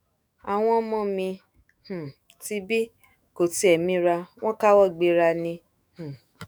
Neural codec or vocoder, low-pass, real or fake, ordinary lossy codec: autoencoder, 48 kHz, 128 numbers a frame, DAC-VAE, trained on Japanese speech; none; fake; none